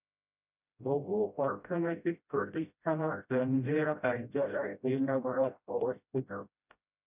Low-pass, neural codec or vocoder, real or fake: 3.6 kHz; codec, 16 kHz, 0.5 kbps, FreqCodec, smaller model; fake